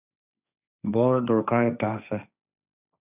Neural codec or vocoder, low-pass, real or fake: codec, 16 kHz, 1.1 kbps, Voila-Tokenizer; 3.6 kHz; fake